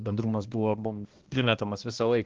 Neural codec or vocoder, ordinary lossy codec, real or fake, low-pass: codec, 16 kHz, 1 kbps, X-Codec, HuBERT features, trained on balanced general audio; Opus, 16 kbps; fake; 7.2 kHz